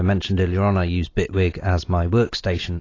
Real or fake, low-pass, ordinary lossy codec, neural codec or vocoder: real; 7.2 kHz; AAC, 32 kbps; none